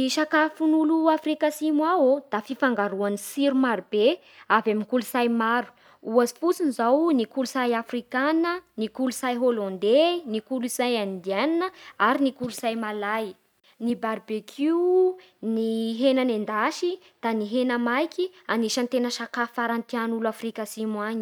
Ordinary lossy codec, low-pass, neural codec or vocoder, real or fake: none; 19.8 kHz; none; real